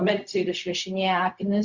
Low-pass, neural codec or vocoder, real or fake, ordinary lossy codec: 7.2 kHz; codec, 16 kHz, 0.4 kbps, LongCat-Audio-Codec; fake; Opus, 64 kbps